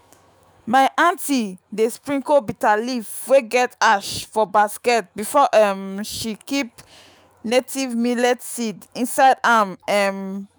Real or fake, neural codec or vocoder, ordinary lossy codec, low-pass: fake; autoencoder, 48 kHz, 128 numbers a frame, DAC-VAE, trained on Japanese speech; none; none